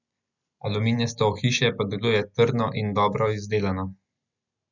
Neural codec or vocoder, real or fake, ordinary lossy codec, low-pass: none; real; none; 7.2 kHz